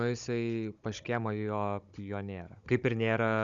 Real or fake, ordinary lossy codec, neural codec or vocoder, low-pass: fake; Opus, 64 kbps; codec, 16 kHz, 16 kbps, FunCodec, trained on Chinese and English, 50 frames a second; 7.2 kHz